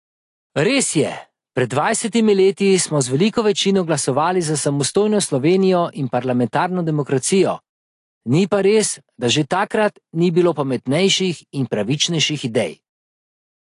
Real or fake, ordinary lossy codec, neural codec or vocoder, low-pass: real; AAC, 48 kbps; none; 10.8 kHz